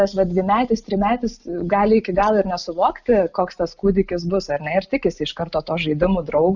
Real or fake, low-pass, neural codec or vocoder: real; 7.2 kHz; none